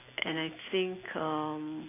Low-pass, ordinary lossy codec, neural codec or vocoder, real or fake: 3.6 kHz; AAC, 24 kbps; none; real